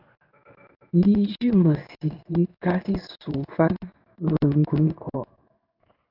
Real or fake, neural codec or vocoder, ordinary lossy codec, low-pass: fake; codec, 16 kHz in and 24 kHz out, 1 kbps, XY-Tokenizer; AAC, 48 kbps; 5.4 kHz